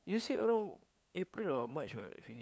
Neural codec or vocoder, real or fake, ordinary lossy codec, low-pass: codec, 16 kHz, 2 kbps, FunCodec, trained on LibriTTS, 25 frames a second; fake; none; none